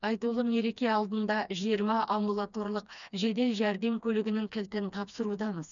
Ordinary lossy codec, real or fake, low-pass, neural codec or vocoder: none; fake; 7.2 kHz; codec, 16 kHz, 2 kbps, FreqCodec, smaller model